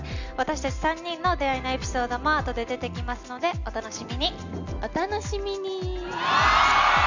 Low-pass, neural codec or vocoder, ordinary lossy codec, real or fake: 7.2 kHz; none; none; real